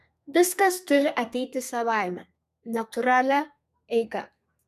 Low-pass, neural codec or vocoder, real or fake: 14.4 kHz; codec, 32 kHz, 1.9 kbps, SNAC; fake